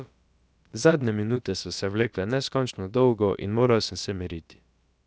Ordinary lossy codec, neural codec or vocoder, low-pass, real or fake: none; codec, 16 kHz, about 1 kbps, DyCAST, with the encoder's durations; none; fake